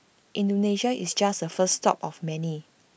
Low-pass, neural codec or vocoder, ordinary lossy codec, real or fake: none; none; none; real